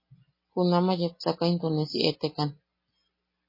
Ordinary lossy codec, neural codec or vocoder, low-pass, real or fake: MP3, 24 kbps; none; 5.4 kHz; real